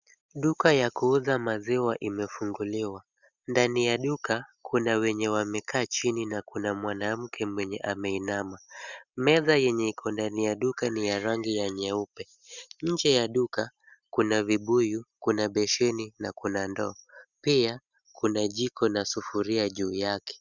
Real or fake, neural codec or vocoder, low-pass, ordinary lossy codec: real; none; 7.2 kHz; Opus, 64 kbps